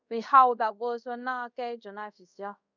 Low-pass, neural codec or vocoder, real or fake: 7.2 kHz; codec, 24 kHz, 0.5 kbps, DualCodec; fake